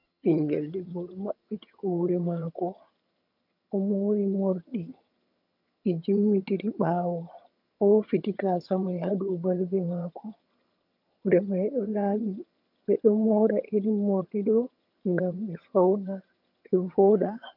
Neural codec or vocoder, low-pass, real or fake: vocoder, 22.05 kHz, 80 mel bands, HiFi-GAN; 5.4 kHz; fake